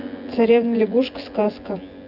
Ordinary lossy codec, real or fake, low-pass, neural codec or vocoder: AAC, 48 kbps; fake; 5.4 kHz; vocoder, 24 kHz, 100 mel bands, Vocos